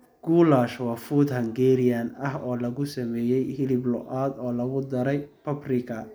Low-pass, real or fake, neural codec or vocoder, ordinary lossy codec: none; real; none; none